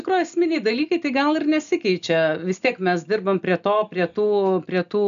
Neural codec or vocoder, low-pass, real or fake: none; 7.2 kHz; real